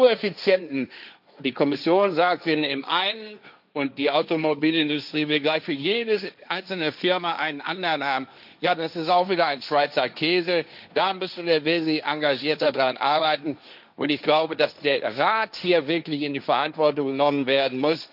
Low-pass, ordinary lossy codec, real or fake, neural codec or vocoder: 5.4 kHz; none; fake; codec, 16 kHz, 1.1 kbps, Voila-Tokenizer